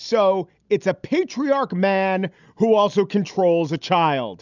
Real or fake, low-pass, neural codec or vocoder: real; 7.2 kHz; none